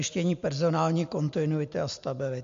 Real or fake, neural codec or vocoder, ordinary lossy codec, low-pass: real; none; MP3, 48 kbps; 7.2 kHz